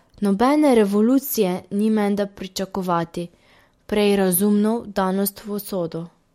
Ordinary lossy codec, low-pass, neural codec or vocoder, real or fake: MP3, 64 kbps; 19.8 kHz; none; real